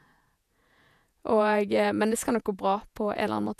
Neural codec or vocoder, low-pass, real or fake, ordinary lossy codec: vocoder, 48 kHz, 128 mel bands, Vocos; 14.4 kHz; fake; none